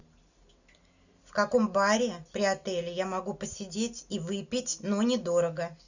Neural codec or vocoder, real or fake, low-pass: none; real; 7.2 kHz